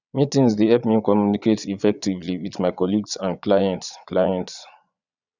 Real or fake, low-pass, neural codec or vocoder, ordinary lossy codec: fake; 7.2 kHz; vocoder, 22.05 kHz, 80 mel bands, Vocos; none